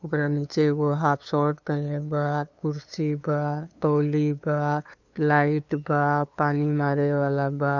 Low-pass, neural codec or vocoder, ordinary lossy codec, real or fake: 7.2 kHz; codec, 16 kHz, 2 kbps, FunCodec, trained on LibriTTS, 25 frames a second; none; fake